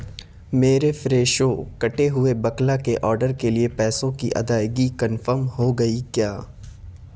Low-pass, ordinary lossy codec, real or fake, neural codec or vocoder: none; none; real; none